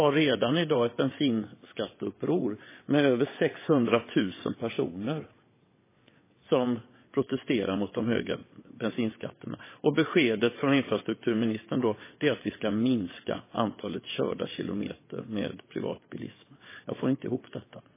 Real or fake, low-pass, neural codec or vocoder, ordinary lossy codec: fake; 3.6 kHz; vocoder, 22.05 kHz, 80 mel bands, WaveNeXt; MP3, 16 kbps